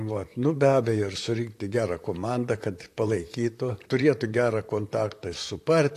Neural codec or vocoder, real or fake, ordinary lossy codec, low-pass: vocoder, 44.1 kHz, 128 mel bands, Pupu-Vocoder; fake; AAC, 64 kbps; 14.4 kHz